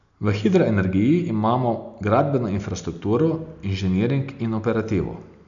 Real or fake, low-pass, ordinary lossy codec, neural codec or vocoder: real; 7.2 kHz; none; none